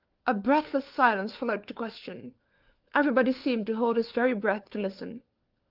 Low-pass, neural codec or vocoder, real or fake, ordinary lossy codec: 5.4 kHz; codec, 16 kHz, 4 kbps, FunCodec, trained on LibriTTS, 50 frames a second; fake; Opus, 32 kbps